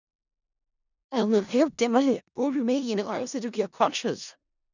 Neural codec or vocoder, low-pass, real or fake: codec, 16 kHz in and 24 kHz out, 0.4 kbps, LongCat-Audio-Codec, four codebook decoder; 7.2 kHz; fake